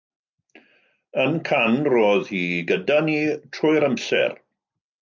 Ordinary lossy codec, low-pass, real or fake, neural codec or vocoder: MP3, 64 kbps; 7.2 kHz; real; none